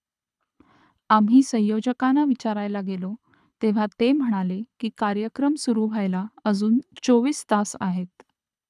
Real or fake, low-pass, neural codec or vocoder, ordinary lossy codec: fake; none; codec, 24 kHz, 6 kbps, HILCodec; none